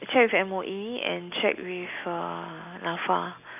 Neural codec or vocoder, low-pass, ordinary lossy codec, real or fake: none; 3.6 kHz; none; real